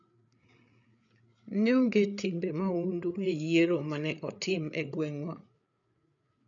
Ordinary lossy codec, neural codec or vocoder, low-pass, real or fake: none; codec, 16 kHz, 8 kbps, FreqCodec, larger model; 7.2 kHz; fake